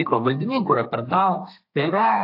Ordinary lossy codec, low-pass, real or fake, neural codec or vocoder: AAC, 32 kbps; 5.4 kHz; fake; codec, 32 kHz, 1.9 kbps, SNAC